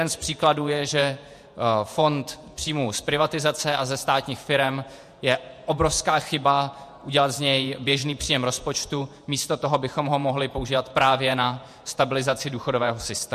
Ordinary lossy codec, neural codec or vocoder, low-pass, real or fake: MP3, 64 kbps; vocoder, 48 kHz, 128 mel bands, Vocos; 14.4 kHz; fake